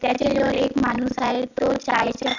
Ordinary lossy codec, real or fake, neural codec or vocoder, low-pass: none; real; none; 7.2 kHz